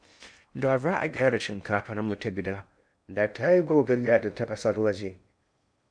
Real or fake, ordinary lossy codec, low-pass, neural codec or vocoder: fake; none; 9.9 kHz; codec, 16 kHz in and 24 kHz out, 0.6 kbps, FocalCodec, streaming, 2048 codes